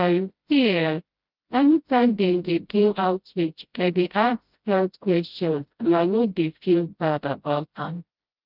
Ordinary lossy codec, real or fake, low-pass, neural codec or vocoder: Opus, 24 kbps; fake; 5.4 kHz; codec, 16 kHz, 0.5 kbps, FreqCodec, smaller model